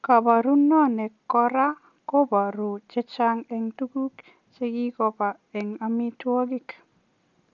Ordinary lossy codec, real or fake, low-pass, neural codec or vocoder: none; real; 7.2 kHz; none